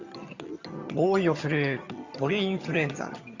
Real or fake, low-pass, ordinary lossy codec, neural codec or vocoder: fake; 7.2 kHz; Opus, 64 kbps; vocoder, 22.05 kHz, 80 mel bands, HiFi-GAN